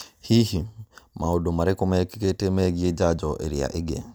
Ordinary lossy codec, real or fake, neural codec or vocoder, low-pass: none; real; none; none